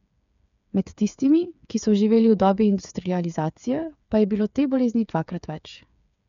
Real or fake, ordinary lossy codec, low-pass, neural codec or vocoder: fake; none; 7.2 kHz; codec, 16 kHz, 8 kbps, FreqCodec, smaller model